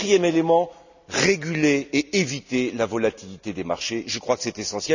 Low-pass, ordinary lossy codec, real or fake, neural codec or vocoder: 7.2 kHz; none; real; none